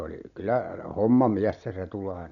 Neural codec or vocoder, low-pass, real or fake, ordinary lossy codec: none; 7.2 kHz; real; none